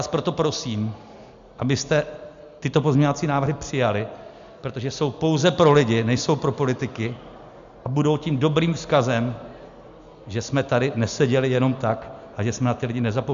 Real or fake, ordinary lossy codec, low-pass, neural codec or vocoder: real; MP3, 64 kbps; 7.2 kHz; none